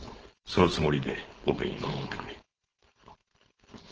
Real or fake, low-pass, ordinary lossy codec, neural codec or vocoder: fake; 7.2 kHz; Opus, 16 kbps; codec, 16 kHz, 4.8 kbps, FACodec